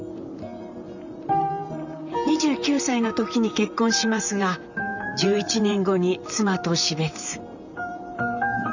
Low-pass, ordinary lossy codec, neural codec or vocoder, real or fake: 7.2 kHz; none; vocoder, 44.1 kHz, 128 mel bands, Pupu-Vocoder; fake